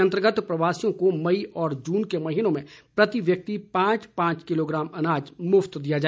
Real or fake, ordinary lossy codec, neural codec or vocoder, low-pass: real; none; none; none